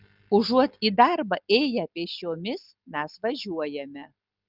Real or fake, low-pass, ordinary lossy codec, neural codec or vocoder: real; 5.4 kHz; Opus, 32 kbps; none